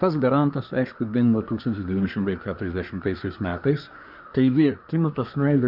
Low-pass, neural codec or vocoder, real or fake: 5.4 kHz; codec, 24 kHz, 1 kbps, SNAC; fake